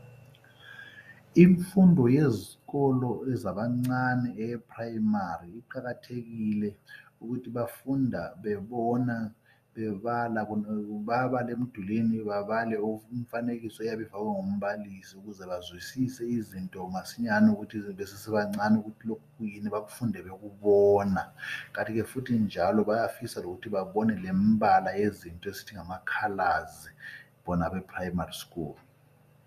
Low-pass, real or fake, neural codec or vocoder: 14.4 kHz; real; none